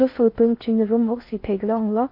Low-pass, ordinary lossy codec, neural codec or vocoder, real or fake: 5.4 kHz; MP3, 32 kbps; codec, 16 kHz in and 24 kHz out, 0.6 kbps, FocalCodec, streaming, 4096 codes; fake